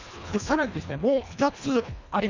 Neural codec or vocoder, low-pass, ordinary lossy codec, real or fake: codec, 24 kHz, 1.5 kbps, HILCodec; 7.2 kHz; Opus, 64 kbps; fake